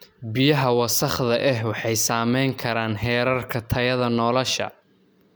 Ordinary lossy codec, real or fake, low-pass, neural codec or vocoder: none; real; none; none